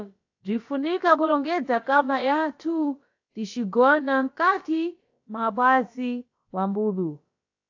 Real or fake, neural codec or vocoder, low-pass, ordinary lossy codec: fake; codec, 16 kHz, about 1 kbps, DyCAST, with the encoder's durations; 7.2 kHz; AAC, 48 kbps